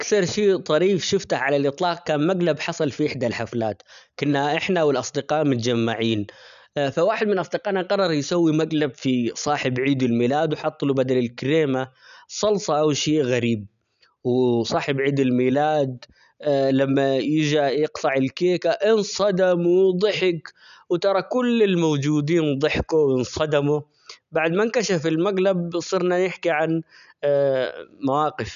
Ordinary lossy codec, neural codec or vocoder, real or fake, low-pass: none; none; real; 7.2 kHz